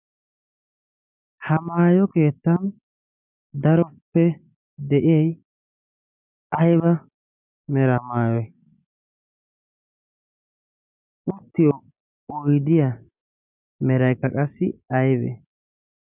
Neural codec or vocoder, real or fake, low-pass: none; real; 3.6 kHz